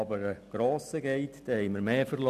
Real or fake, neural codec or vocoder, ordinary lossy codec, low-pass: real; none; none; 14.4 kHz